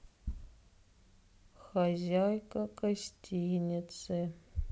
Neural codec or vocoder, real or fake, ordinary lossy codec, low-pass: none; real; none; none